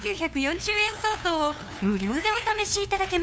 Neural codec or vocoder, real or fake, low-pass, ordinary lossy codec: codec, 16 kHz, 2 kbps, FunCodec, trained on LibriTTS, 25 frames a second; fake; none; none